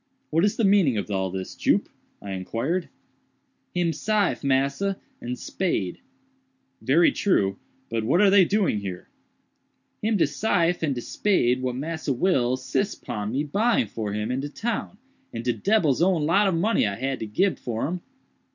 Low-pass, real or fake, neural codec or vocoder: 7.2 kHz; real; none